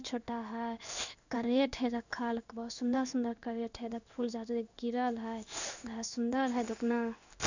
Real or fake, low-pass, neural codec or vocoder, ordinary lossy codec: fake; 7.2 kHz; codec, 16 kHz in and 24 kHz out, 1 kbps, XY-Tokenizer; none